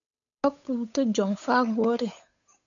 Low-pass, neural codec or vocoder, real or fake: 7.2 kHz; codec, 16 kHz, 8 kbps, FunCodec, trained on Chinese and English, 25 frames a second; fake